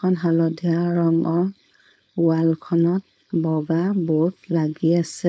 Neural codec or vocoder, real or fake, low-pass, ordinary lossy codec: codec, 16 kHz, 4.8 kbps, FACodec; fake; none; none